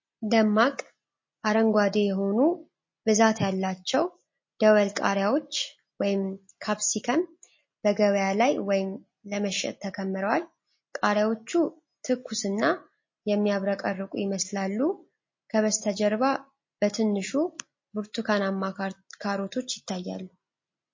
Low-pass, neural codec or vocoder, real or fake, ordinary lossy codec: 7.2 kHz; none; real; MP3, 32 kbps